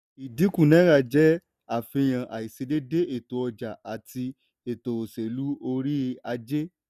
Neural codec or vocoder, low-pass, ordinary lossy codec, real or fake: none; 14.4 kHz; none; real